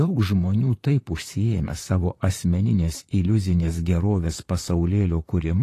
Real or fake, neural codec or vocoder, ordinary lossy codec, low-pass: fake; vocoder, 44.1 kHz, 128 mel bands, Pupu-Vocoder; AAC, 48 kbps; 14.4 kHz